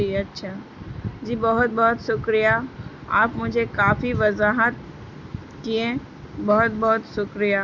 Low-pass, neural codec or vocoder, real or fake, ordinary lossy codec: 7.2 kHz; none; real; none